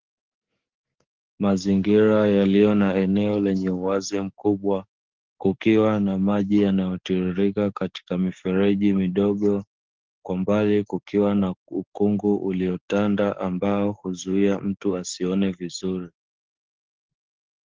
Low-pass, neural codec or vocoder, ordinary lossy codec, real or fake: 7.2 kHz; codec, 44.1 kHz, 7.8 kbps, DAC; Opus, 16 kbps; fake